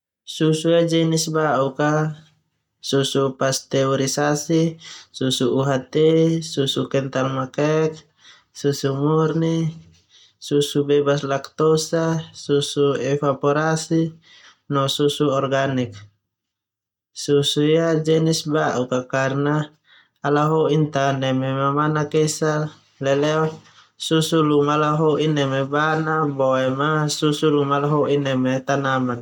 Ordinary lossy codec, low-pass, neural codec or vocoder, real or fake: none; 19.8 kHz; none; real